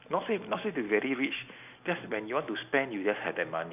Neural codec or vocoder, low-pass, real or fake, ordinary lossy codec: none; 3.6 kHz; real; none